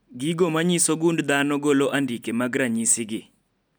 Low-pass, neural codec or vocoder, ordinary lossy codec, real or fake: none; none; none; real